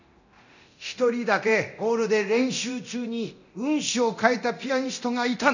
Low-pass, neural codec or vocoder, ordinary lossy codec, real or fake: 7.2 kHz; codec, 24 kHz, 0.9 kbps, DualCodec; none; fake